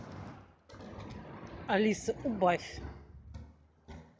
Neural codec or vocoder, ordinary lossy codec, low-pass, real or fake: none; none; none; real